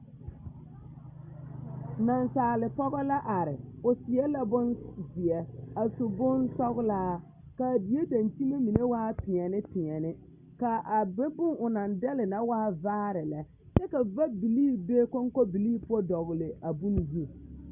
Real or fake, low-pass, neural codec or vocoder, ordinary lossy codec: real; 3.6 kHz; none; Opus, 64 kbps